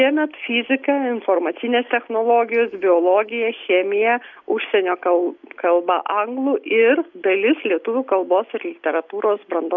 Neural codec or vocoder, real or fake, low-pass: none; real; 7.2 kHz